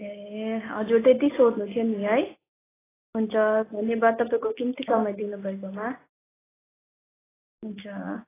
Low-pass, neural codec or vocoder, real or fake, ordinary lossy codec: 3.6 kHz; none; real; AAC, 16 kbps